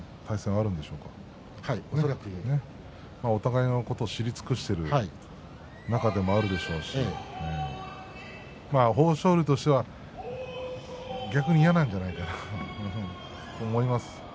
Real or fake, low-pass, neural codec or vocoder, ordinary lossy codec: real; none; none; none